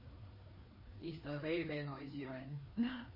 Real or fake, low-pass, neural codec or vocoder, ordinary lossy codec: fake; 5.4 kHz; codec, 16 kHz, 2 kbps, FreqCodec, larger model; MP3, 24 kbps